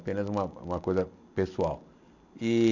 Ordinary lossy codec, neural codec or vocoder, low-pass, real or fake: none; none; 7.2 kHz; real